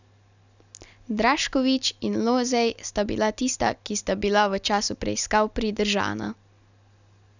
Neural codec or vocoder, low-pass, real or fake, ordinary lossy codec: none; 7.2 kHz; real; none